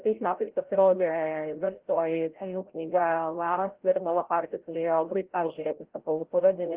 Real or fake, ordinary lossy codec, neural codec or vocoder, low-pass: fake; Opus, 16 kbps; codec, 16 kHz, 0.5 kbps, FreqCodec, larger model; 3.6 kHz